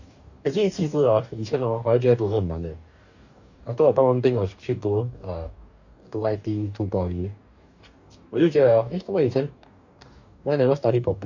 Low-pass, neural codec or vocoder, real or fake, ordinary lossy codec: 7.2 kHz; codec, 44.1 kHz, 2.6 kbps, DAC; fake; none